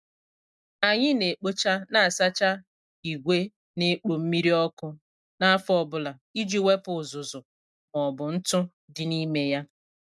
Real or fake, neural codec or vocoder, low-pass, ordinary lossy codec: real; none; none; none